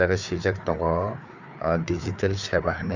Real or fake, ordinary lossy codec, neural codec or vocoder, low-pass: fake; none; codec, 16 kHz, 4 kbps, FreqCodec, larger model; 7.2 kHz